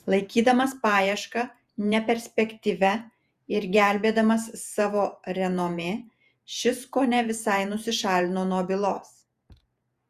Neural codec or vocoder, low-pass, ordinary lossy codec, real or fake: vocoder, 48 kHz, 128 mel bands, Vocos; 14.4 kHz; Opus, 64 kbps; fake